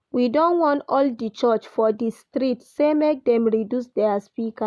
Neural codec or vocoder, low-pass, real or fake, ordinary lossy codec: none; none; real; none